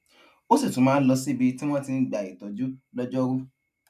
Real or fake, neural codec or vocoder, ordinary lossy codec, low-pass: real; none; none; 14.4 kHz